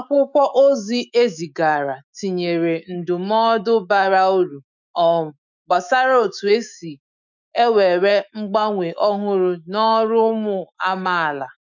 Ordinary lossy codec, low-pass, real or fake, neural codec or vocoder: none; 7.2 kHz; fake; autoencoder, 48 kHz, 128 numbers a frame, DAC-VAE, trained on Japanese speech